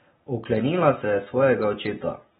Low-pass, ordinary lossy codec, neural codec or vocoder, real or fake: 14.4 kHz; AAC, 16 kbps; none; real